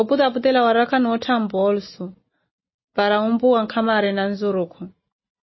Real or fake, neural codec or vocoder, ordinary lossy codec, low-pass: real; none; MP3, 24 kbps; 7.2 kHz